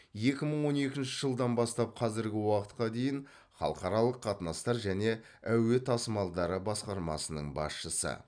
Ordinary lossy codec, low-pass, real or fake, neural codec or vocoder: none; 9.9 kHz; real; none